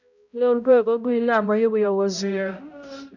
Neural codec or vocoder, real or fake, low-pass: codec, 16 kHz, 0.5 kbps, X-Codec, HuBERT features, trained on balanced general audio; fake; 7.2 kHz